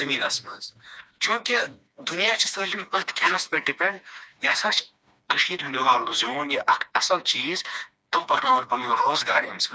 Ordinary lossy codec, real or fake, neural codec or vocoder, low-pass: none; fake; codec, 16 kHz, 2 kbps, FreqCodec, smaller model; none